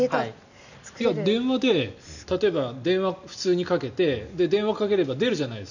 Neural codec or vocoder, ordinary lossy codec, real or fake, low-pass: none; none; real; 7.2 kHz